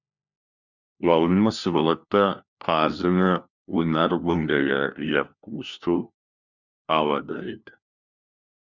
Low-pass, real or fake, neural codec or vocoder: 7.2 kHz; fake; codec, 16 kHz, 1 kbps, FunCodec, trained on LibriTTS, 50 frames a second